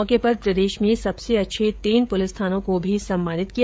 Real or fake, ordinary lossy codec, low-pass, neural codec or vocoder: fake; none; none; codec, 16 kHz, 16 kbps, FreqCodec, smaller model